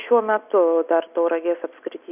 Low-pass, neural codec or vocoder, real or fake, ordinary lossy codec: 3.6 kHz; none; real; AAC, 32 kbps